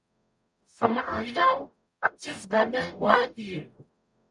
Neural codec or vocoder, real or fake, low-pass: codec, 44.1 kHz, 0.9 kbps, DAC; fake; 10.8 kHz